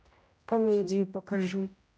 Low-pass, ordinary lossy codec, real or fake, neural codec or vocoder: none; none; fake; codec, 16 kHz, 0.5 kbps, X-Codec, HuBERT features, trained on general audio